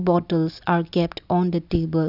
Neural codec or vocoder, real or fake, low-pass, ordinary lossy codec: none; real; 5.4 kHz; none